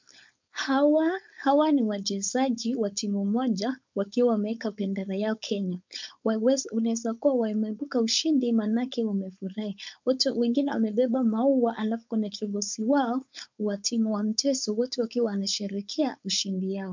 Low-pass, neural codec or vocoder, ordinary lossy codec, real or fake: 7.2 kHz; codec, 16 kHz, 4.8 kbps, FACodec; MP3, 64 kbps; fake